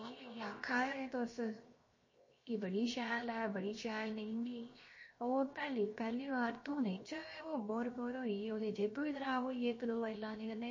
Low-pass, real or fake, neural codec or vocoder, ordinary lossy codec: 7.2 kHz; fake; codec, 16 kHz, 0.7 kbps, FocalCodec; MP3, 32 kbps